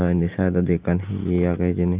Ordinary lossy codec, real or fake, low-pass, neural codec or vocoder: Opus, 24 kbps; real; 3.6 kHz; none